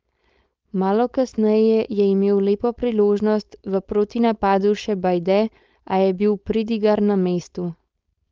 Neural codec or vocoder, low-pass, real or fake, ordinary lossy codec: codec, 16 kHz, 4.8 kbps, FACodec; 7.2 kHz; fake; Opus, 24 kbps